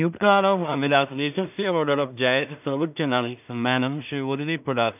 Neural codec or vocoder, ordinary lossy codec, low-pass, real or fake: codec, 16 kHz in and 24 kHz out, 0.4 kbps, LongCat-Audio-Codec, two codebook decoder; none; 3.6 kHz; fake